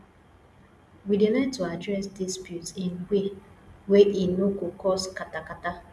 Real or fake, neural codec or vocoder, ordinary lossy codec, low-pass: real; none; none; none